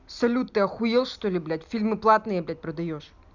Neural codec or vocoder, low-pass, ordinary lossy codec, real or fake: none; 7.2 kHz; none; real